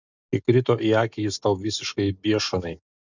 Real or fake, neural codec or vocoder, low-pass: real; none; 7.2 kHz